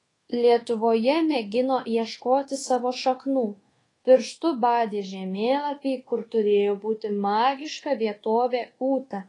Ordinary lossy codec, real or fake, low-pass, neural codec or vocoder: AAC, 32 kbps; fake; 10.8 kHz; codec, 24 kHz, 1.2 kbps, DualCodec